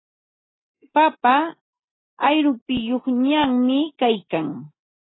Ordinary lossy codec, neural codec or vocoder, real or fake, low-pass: AAC, 16 kbps; none; real; 7.2 kHz